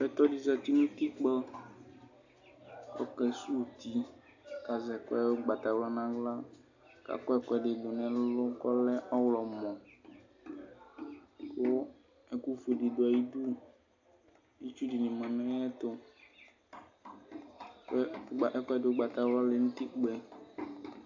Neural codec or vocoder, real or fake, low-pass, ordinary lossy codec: none; real; 7.2 kHz; AAC, 48 kbps